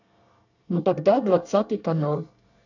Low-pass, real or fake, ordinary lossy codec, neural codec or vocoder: 7.2 kHz; fake; none; codec, 24 kHz, 1 kbps, SNAC